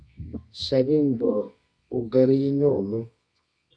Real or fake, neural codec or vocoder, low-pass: fake; codec, 24 kHz, 0.9 kbps, WavTokenizer, medium music audio release; 9.9 kHz